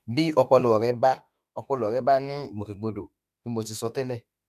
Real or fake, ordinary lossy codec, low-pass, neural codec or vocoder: fake; none; 14.4 kHz; autoencoder, 48 kHz, 32 numbers a frame, DAC-VAE, trained on Japanese speech